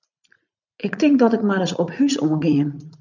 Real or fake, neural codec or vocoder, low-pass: fake; vocoder, 44.1 kHz, 80 mel bands, Vocos; 7.2 kHz